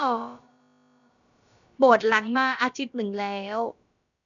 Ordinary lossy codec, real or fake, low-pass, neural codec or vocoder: none; fake; 7.2 kHz; codec, 16 kHz, about 1 kbps, DyCAST, with the encoder's durations